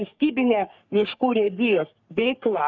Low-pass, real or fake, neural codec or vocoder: 7.2 kHz; fake; codec, 44.1 kHz, 3.4 kbps, Pupu-Codec